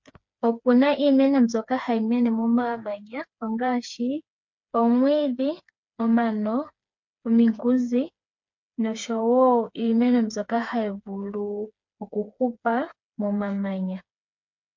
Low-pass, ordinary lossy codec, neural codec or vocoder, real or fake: 7.2 kHz; MP3, 48 kbps; codec, 16 kHz, 4 kbps, FreqCodec, smaller model; fake